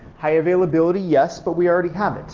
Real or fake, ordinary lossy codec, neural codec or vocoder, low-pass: real; Opus, 32 kbps; none; 7.2 kHz